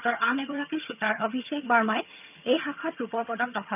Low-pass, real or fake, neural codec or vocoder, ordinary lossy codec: 3.6 kHz; fake; vocoder, 22.05 kHz, 80 mel bands, HiFi-GAN; none